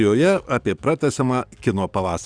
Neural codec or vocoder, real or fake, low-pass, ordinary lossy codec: codec, 44.1 kHz, 7.8 kbps, DAC; fake; 9.9 kHz; Opus, 64 kbps